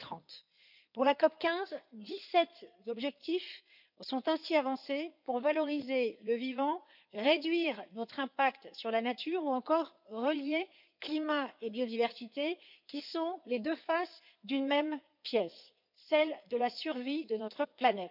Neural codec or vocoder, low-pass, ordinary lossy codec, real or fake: codec, 16 kHz in and 24 kHz out, 2.2 kbps, FireRedTTS-2 codec; 5.4 kHz; none; fake